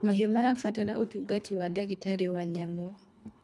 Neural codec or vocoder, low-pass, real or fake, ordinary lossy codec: codec, 24 kHz, 1.5 kbps, HILCodec; none; fake; none